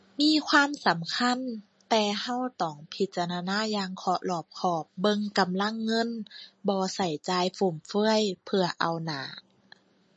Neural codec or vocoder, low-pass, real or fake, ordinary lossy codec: none; 9.9 kHz; real; MP3, 32 kbps